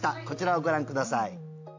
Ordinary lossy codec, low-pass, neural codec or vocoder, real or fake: AAC, 48 kbps; 7.2 kHz; none; real